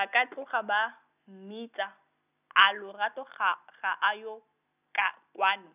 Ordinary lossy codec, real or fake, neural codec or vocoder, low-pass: none; real; none; 3.6 kHz